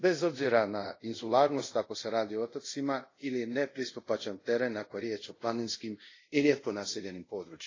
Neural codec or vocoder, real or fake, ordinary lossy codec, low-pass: codec, 24 kHz, 0.5 kbps, DualCodec; fake; AAC, 32 kbps; 7.2 kHz